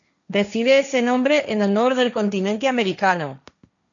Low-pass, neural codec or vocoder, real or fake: 7.2 kHz; codec, 16 kHz, 1.1 kbps, Voila-Tokenizer; fake